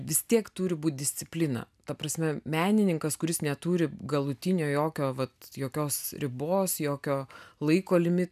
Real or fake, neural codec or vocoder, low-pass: real; none; 14.4 kHz